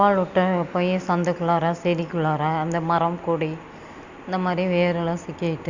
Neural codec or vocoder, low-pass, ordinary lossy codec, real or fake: none; 7.2 kHz; Opus, 64 kbps; real